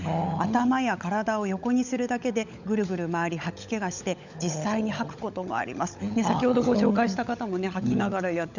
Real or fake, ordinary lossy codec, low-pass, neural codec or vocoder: fake; none; 7.2 kHz; codec, 16 kHz, 16 kbps, FunCodec, trained on LibriTTS, 50 frames a second